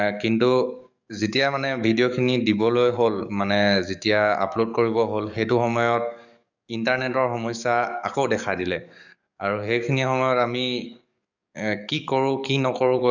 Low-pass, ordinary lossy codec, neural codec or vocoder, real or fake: 7.2 kHz; none; codec, 44.1 kHz, 7.8 kbps, DAC; fake